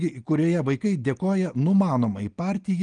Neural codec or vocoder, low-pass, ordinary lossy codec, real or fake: none; 9.9 kHz; Opus, 24 kbps; real